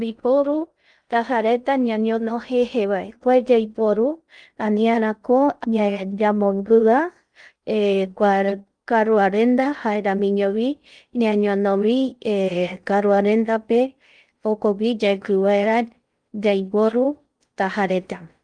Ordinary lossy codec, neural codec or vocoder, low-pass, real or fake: Opus, 32 kbps; codec, 16 kHz in and 24 kHz out, 0.6 kbps, FocalCodec, streaming, 2048 codes; 9.9 kHz; fake